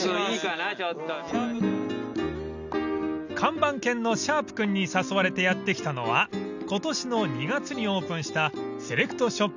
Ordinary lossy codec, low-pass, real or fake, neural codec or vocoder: none; 7.2 kHz; real; none